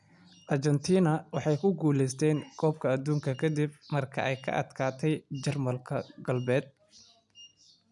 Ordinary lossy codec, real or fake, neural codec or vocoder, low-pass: none; real; none; 10.8 kHz